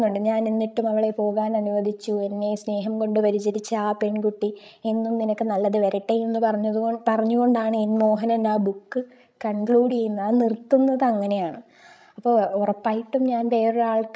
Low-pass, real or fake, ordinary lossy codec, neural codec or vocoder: none; fake; none; codec, 16 kHz, 16 kbps, FreqCodec, larger model